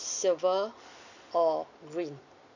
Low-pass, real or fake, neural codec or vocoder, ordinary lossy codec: 7.2 kHz; real; none; none